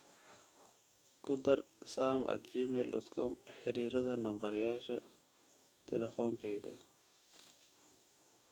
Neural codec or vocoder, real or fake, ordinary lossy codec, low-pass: codec, 44.1 kHz, 2.6 kbps, DAC; fake; none; 19.8 kHz